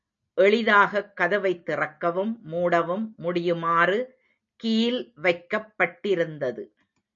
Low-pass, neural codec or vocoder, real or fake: 7.2 kHz; none; real